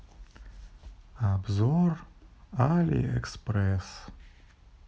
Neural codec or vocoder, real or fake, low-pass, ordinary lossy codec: none; real; none; none